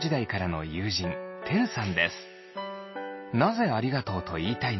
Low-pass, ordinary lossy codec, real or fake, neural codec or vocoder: 7.2 kHz; MP3, 24 kbps; real; none